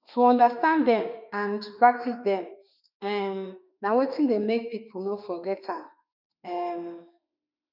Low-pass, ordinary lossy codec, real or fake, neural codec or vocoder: 5.4 kHz; none; fake; autoencoder, 48 kHz, 32 numbers a frame, DAC-VAE, trained on Japanese speech